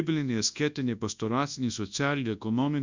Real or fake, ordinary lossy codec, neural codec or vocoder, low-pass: fake; Opus, 64 kbps; codec, 24 kHz, 0.9 kbps, WavTokenizer, large speech release; 7.2 kHz